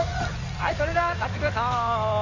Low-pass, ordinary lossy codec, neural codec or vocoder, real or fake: 7.2 kHz; none; codec, 16 kHz in and 24 kHz out, 2.2 kbps, FireRedTTS-2 codec; fake